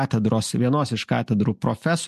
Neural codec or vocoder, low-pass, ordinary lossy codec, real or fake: none; 14.4 kHz; MP3, 64 kbps; real